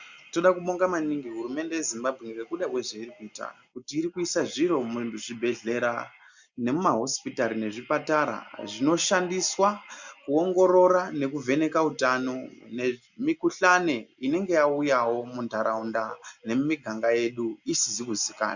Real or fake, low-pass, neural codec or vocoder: real; 7.2 kHz; none